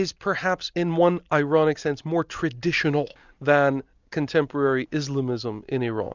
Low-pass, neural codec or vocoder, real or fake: 7.2 kHz; none; real